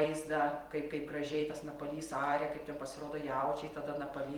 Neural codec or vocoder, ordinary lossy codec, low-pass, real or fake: vocoder, 44.1 kHz, 128 mel bands every 256 samples, BigVGAN v2; Opus, 24 kbps; 19.8 kHz; fake